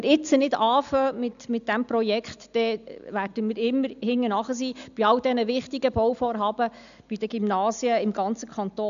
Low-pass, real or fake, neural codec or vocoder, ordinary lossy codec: 7.2 kHz; real; none; none